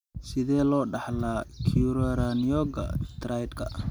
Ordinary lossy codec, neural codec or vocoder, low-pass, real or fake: none; none; 19.8 kHz; real